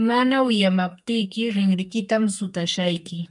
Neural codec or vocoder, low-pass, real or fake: codec, 32 kHz, 1.9 kbps, SNAC; 10.8 kHz; fake